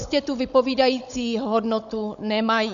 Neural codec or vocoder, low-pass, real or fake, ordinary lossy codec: codec, 16 kHz, 16 kbps, FunCodec, trained on Chinese and English, 50 frames a second; 7.2 kHz; fake; AAC, 96 kbps